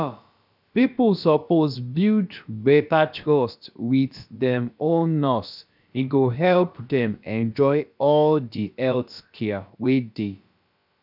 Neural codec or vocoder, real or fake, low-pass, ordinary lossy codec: codec, 16 kHz, about 1 kbps, DyCAST, with the encoder's durations; fake; 5.4 kHz; none